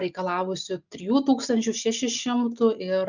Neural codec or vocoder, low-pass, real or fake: none; 7.2 kHz; real